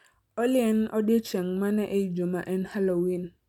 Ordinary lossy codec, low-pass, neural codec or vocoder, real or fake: none; 19.8 kHz; none; real